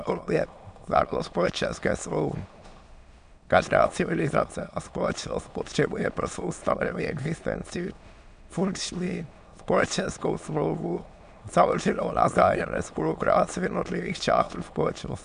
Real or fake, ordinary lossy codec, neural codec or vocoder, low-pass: fake; MP3, 96 kbps; autoencoder, 22.05 kHz, a latent of 192 numbers a frame, VITS, trained on many speakers; 9.9 kHz